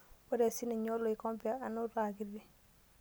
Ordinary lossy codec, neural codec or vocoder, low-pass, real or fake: none; none; none; real